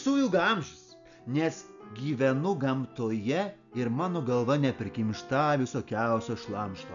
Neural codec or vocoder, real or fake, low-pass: none; real; 7.2 kHz